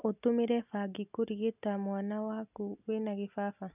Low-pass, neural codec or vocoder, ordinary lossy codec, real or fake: 3.6 kHz; none; none; real